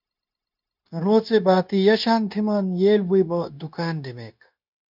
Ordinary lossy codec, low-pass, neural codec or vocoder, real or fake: MP3, 48 kbps; 5.4 kHz; codec, 16 kHz, 0.9 kbps, LongCat-Audio-Codec; fake